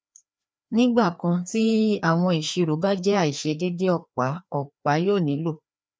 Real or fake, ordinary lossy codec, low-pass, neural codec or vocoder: fake; none; none; codec, 16 kHz, 2 kbps, FreqCodec, larger model